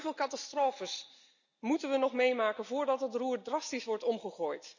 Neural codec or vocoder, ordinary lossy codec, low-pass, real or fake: none; none; 7.2 kHz; real